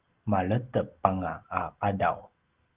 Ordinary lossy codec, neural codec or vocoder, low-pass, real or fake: Opus, 16 kbps; none; 3.6 kHz; real